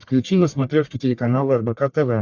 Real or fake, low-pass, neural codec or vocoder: fake; 7.2 kHz; codec, 44.1 kHz, 1.7 kbps, Pupu-Codec